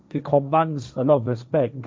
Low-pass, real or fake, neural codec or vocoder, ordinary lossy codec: none; fake; codec, 16 kHz, 1.1 kbps, Voila-Tokenizer; none